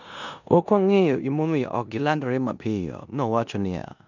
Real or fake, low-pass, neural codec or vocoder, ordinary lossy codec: fake; 7.2 kHz; codec, 16 kHz in and 24 kHz out, 0.9 kbps, LongCat-Audio-Codec, four codebook decoder; none